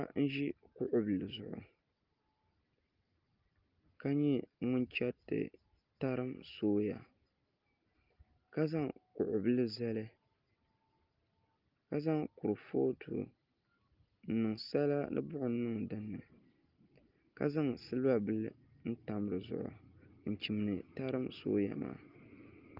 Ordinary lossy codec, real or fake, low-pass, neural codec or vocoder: Opus, 32 kbps; real; 5.4 kHz; none